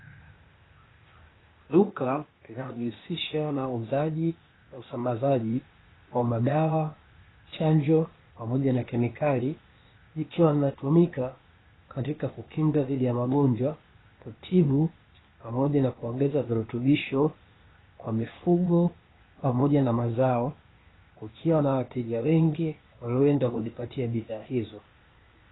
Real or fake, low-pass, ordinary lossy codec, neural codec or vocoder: fake; 7.2 kHz; AAC, 16 kbps; codec, 16 kHz, 0.8 kbps, ZipCodec